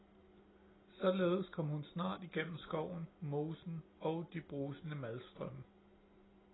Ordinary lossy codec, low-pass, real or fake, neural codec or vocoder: AAC, 16 kbps; 7.2 kHz; real; none